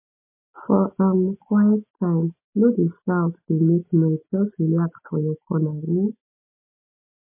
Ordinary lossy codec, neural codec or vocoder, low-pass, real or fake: MP3, 24 kbps; none; 3.6 kHz; real